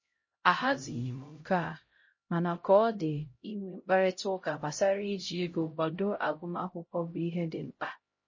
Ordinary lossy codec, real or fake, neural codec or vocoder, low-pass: MP3, 32 kbps; fake; codec, 16 kHz, 0.5 kbps, X-Codec, HuBERT features, trained on LibriSpeech; 7.2 kHz